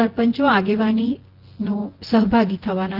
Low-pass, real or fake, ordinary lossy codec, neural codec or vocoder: 5.4 kHz; fake; Opus, 16 kbps; vocoder, 24 kHz, 100 mel bands, Vocos